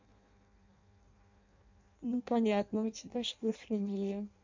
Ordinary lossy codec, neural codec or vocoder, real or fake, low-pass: MP3, 48 kbps; codec, 16 kHz in and 24 kHz out, 0.6 kbps, FireRedTTS-2 codec; fake; 7.2 kHz